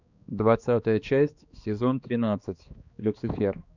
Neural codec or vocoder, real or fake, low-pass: codec, 16 kHz, 2 kbps, X-Codec, HuBERT features, trained on balanced general audio; fake; 7.2 kHz